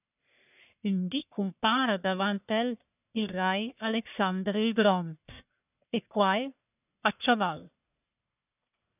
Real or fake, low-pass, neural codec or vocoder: fake; 3.6 kHz; codec, 44.1 kHz, 1.7 kbps, Pupu-Codec